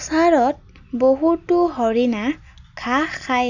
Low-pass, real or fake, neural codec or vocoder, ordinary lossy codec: 7.2 kHz; real; none; none